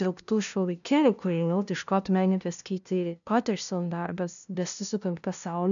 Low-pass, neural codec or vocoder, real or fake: 7.2 kHz; codec, 16 kHz, 0.5 kbps, FunCodec, trained on LibriTTS, 25 frames a second; fake